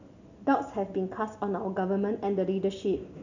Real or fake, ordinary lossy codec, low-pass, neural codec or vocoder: real; none; 7.2 kHz; none